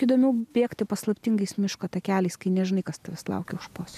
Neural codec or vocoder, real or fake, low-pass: none; real; 14.4 kHz